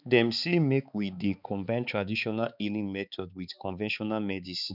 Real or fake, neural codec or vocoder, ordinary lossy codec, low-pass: fake; codec, 16 kHz, 4 kbps, X-Codec, HuBERT features, trained on balanced general audio; none; 5.4 kHz